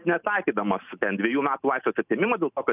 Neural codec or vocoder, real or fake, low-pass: none; real; 3.6 kHz